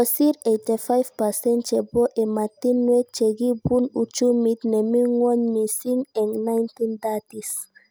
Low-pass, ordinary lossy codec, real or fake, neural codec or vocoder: none; none; real; none